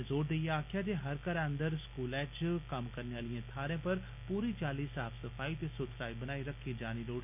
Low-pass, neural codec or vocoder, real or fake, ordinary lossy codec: 3.6 kHz; none; real; none